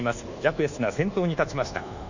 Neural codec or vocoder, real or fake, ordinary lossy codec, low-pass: codec, 24 kHz, 1.2 kbps, DualCodec; fake; none; 7.2 kHz